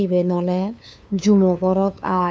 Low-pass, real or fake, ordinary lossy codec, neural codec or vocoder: none; fake; none; codec, 16 kHz, 2 kbps, FunCodec, trained on LibriTTS, 25 frames a second